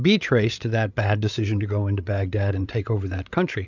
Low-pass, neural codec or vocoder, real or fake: 7.2 kHz; codec, 16 kHz, 8 kbps, FreqCodec, larger model; fake